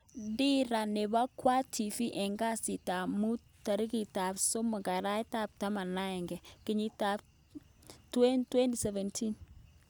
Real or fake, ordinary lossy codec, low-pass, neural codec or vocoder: real; none; none; none